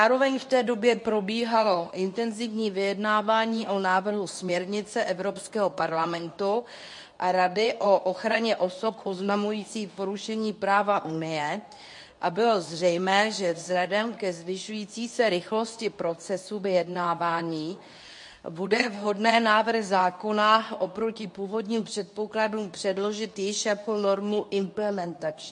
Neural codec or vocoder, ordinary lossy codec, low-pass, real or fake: codec, 24 kHz, 0.9 kbps, WavTokenizer, medium speech release version 2; MP3, 48 kbps; 10.8 kHz; fake